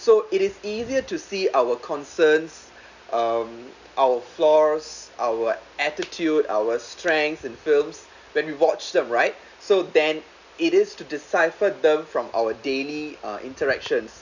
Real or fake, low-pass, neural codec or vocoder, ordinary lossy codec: real; 7.2 kHz; none; none